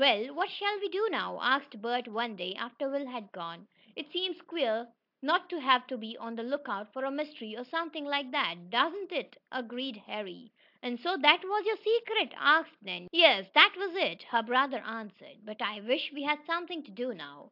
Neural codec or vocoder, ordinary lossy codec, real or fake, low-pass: none; AAC, 48 kbps; real; 5.4 kHz